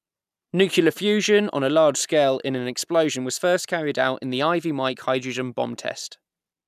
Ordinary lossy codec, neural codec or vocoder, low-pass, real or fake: none; none; 14.4 kHz; real